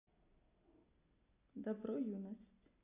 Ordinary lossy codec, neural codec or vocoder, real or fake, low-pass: none; none; real; 3.6 kHz